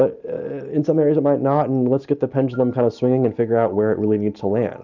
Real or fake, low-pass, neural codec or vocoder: real; 7.2 kHz; none